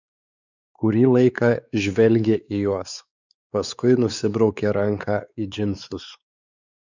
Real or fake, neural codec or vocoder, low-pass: fake; codec, 16 kHz, 4 kbps, X-Codec, WavLM features, trained on Multilingual LibriSpeech; 7.2 kHz